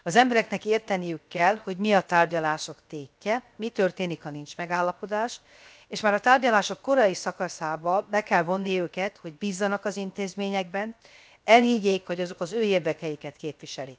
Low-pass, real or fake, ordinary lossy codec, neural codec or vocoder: none; fake; none; codec, 16 kHz, 0.7 kbps, FocalCodec